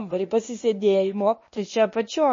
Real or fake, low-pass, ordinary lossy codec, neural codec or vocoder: fake; 7.2 kHz; MP3, 32 kbps; codec, 16 kHz, 0.8 kbps, ZipCodec